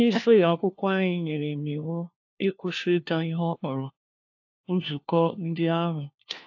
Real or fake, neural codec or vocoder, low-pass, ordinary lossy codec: fake; codec, 16 kHz, 1 kbps, FunCodec, trained on LibriTTS, 50 frames a second; 7.2 kHz; AAC, 48 kbps